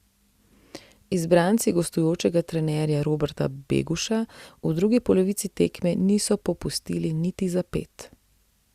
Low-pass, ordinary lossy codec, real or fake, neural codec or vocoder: 14.4 kHz; Opus, 64 kbps; real; none